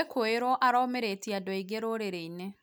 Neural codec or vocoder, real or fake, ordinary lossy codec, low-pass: none; real; none; none